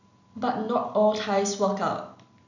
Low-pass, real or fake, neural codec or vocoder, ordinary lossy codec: 7.2 kHz; real; none; none